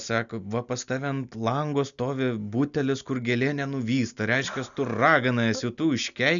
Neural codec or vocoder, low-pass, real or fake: none; 7.2 kHz; real